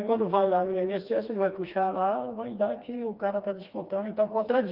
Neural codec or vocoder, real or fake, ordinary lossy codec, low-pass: codec, 16 kHz, 2 kbps, FreqCodec, smaller model; fake; Opus, 24 kbps; 5.4 kHz